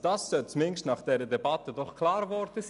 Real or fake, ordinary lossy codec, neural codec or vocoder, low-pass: real; none; none; 10.8 kHz